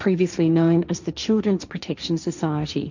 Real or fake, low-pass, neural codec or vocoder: fake; 7.2 kHz; codec, 16 kHz, 1.1 kbps, Voila-Tokenizer